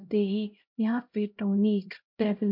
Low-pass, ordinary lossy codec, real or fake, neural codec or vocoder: 5.4 kHz; MP3, 48 kbps; fake; codec, 16 kHz, 0.5 kbps, X-Codec, HuBERT features, trained on LibriSpeech